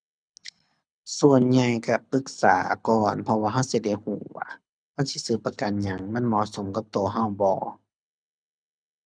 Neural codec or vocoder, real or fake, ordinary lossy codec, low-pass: codec, 24 kHz, 6 kbps, HILCodec; fake; none; 9.9 kHz